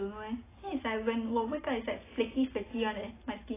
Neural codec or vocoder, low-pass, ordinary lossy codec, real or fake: none; 3.6 kHz; AAC, 16 kbps; real